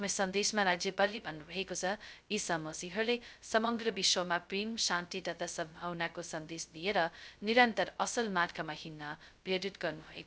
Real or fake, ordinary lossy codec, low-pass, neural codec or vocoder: fake; none; none; codec, 16 kHz, 0.2 kbps, FocalCodec